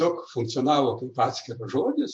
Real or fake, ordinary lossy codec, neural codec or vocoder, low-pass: fake; AAC, 64 kbps; vocoder, 48 kHz, 128 mel bands, Vocos; 9.9 kHz